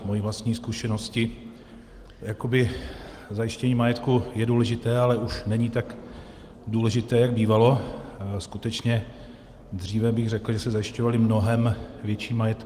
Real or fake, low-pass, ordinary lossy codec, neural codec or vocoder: real; 14.4 kHz; Opus, 24 kbps; none